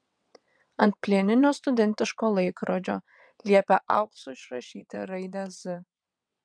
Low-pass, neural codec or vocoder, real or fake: 9.9 kHz; vocoder, 22.05 kHz, 80 mel bands, WaveNeXt; fake